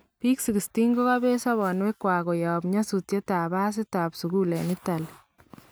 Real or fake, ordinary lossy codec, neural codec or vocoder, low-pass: real; none; none; none